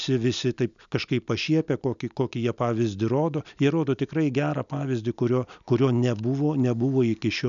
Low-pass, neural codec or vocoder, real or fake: 7.2 kHz; none; real